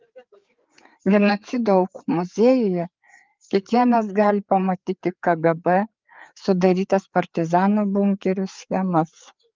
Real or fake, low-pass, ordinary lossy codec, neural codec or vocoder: fake; 7.2 kHz; Opus, 32 kbps; codec, 16 kHz, 4 kbps, FreqCodec, larger model